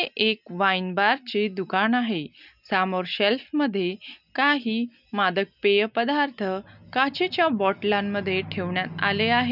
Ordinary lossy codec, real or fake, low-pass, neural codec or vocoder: none; real; 5.4 kHz; none